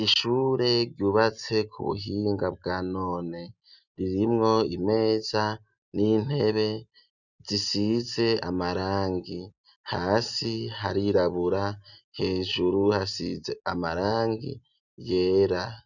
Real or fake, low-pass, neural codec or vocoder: real; 7.2 kHz; none